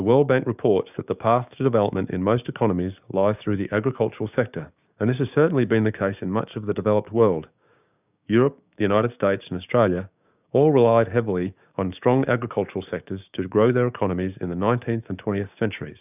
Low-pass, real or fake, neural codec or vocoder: 3.6 kHz; fake; codec, 16 kHz, 6 kbps, DAC